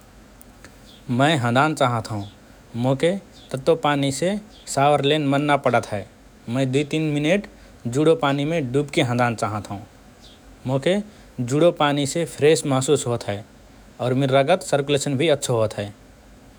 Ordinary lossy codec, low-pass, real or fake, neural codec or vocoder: none; none; fake; autoencoder, 48 kHz, 128 numbers a frame, DAC-VAE, trained on Japanese speech